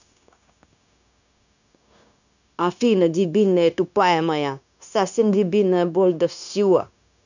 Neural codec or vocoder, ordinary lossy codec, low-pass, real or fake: codec, 16 kHz, 0.9 kbps, LongCat-Audio-Codec; none; 7.2 kHz; fake